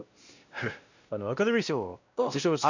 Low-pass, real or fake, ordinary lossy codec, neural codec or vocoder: 7.2 kHz; fake; none; codec, 16 kHz, 1 kbps, X-Codec, WavLM features, trained on Multilingual LibriSpeech